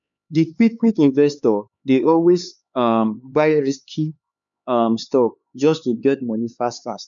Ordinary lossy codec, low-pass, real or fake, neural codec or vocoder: none; 7.2 kHz; fake; codec, 16 kHz, 4 kbps, X-Codec, HuBERT features, trained on LibriSpeech